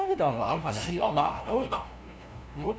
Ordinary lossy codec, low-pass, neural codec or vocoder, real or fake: none; none; codec, 16 kHz, 0.5 kbps, FunCodec, trained on LibriTTS, 25 frames a second; fake